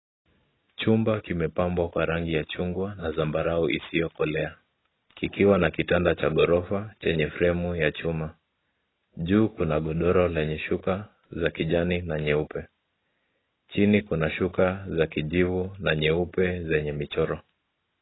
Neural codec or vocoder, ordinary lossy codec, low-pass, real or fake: none; AAC, 16 kbps; 7.2 kHz; real